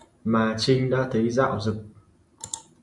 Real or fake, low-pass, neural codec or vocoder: real; 10.8 kHz; none